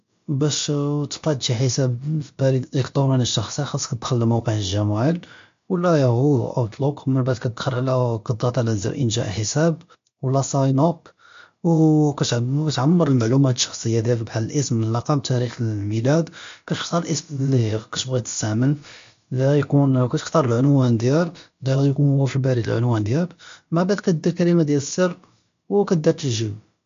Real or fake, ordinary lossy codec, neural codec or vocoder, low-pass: fake; MP3, 48 kbps; codec, 16 kHz, about 1 kbps, DyCAST, with the encoder's durations; 7.2 kHz